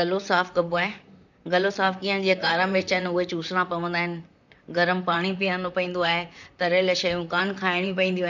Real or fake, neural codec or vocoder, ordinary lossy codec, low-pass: fake; vocoder, 44.1 kHz, 128 mel bands, Pupu-Vocoder; none; 7.2 kHz